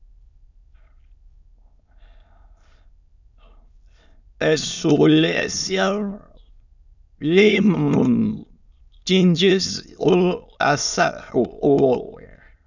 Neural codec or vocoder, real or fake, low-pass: autoencoder, 22.05 kHz, a latent of 192 numbers a frame, VITS, trained on many speakers; fake; 7.2 kHz